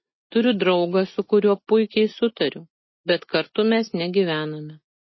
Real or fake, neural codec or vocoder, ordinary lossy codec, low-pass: real; none; MP3, 24 kbps; 7.2 kHz